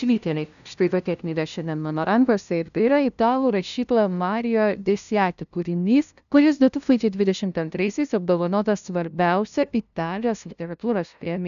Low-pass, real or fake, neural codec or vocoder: 7.2 kHz; fake; codec, 16 kHz, 0.5 kbps, FunCodec, trained on LibriTTS, 25 frames a second